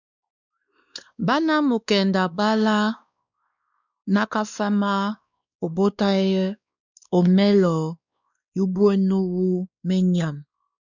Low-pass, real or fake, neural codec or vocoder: 7.2 kHz; fake; codec, 16 kHz, 2 kbps, X-Codec, WavLM features, trained on Multilingual LibriSpeech